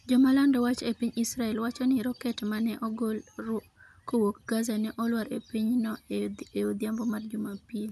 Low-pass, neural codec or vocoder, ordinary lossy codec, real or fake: 14.4 kHz; none; none; real